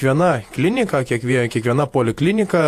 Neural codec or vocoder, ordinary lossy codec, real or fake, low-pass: vocoder, 48 kHz, 128 mel bands, Vocos; AAC, 64 kbps; fake; 14.4 kHz